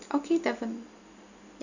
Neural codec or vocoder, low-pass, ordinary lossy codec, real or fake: none; 7.2 kHz; none; real